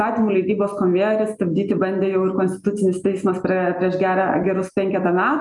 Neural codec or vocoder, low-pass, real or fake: none; 10.8 kHz; real